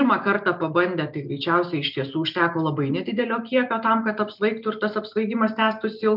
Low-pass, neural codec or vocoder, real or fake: 5.4 kHz; none; real